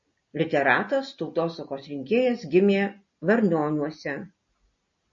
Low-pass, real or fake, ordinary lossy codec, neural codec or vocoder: 7.2 kHz; real; MP3, 32 kbps; none